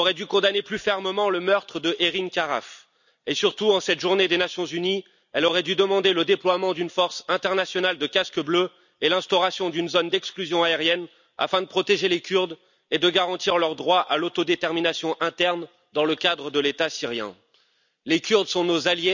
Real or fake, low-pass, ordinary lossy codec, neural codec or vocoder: real; 7.2 kHz; none; none